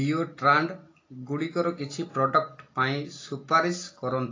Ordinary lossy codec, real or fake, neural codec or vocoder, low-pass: AAC, 32 kbps; real; none; 7.2 kHz